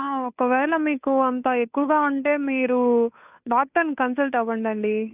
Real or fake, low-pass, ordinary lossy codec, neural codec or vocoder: fake; 3.6 kHz; none; codec, 16 kHz, 2 kbps, FunCodec, trained on Chinese and English, 25 frames a second